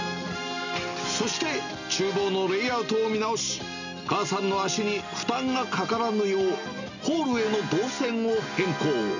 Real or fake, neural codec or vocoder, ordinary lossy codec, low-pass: real; none; none; 7.2 kHz